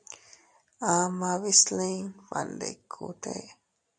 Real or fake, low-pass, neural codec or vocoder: real; 9.9 kHz; none